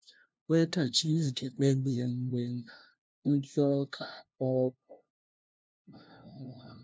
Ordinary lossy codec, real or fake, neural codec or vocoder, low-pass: none; fake; codec, 16 kHz, 0.5 kbps, FunCodec, trained on LibriTTS, 25 frames a second; none